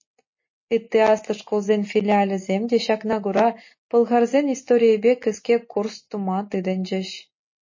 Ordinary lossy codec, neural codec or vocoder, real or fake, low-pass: MP3, 32 kbps; none; real; 7.2 kHz